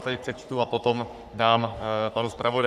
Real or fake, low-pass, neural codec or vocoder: fake; 14.4 kHz; codec, 44.1 kHz, 3.4 kbps, Pupu-Codec